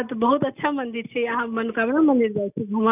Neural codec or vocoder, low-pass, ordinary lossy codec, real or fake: none; 3.6 kHz; none; real